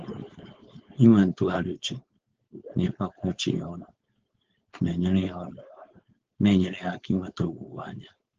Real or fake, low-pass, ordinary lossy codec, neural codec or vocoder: fake; 7.2 kHz; Opus, 16 kbps; codec, 16 kHz, 4.8 kbps, FACodec